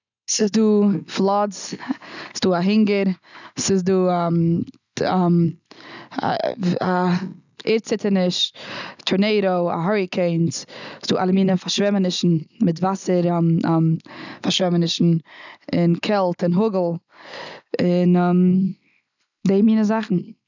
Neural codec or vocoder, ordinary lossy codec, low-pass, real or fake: none; none; 7.2 kHz; real